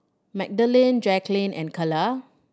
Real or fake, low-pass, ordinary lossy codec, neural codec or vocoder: real; none; none; none